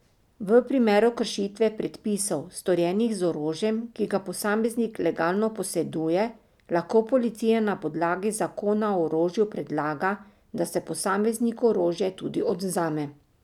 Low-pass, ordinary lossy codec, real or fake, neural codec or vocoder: 19.8 kHz; none; real; none